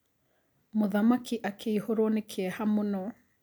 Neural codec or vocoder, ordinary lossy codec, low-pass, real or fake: none; none; none; real